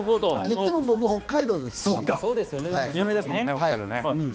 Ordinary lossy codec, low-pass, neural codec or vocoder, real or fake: none; none; codec, 16 kHz, 2 kbps, X-Codec, HuBERT features, trained on balanced general audio; fake